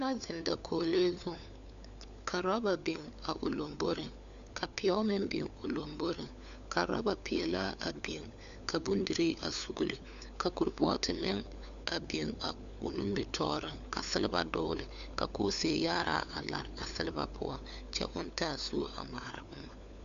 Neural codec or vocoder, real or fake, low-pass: codec, 16 kHz, 4 kbps, FunCodec, trained on LibriTTS, 50 frames a second; fake; 7.2 kHz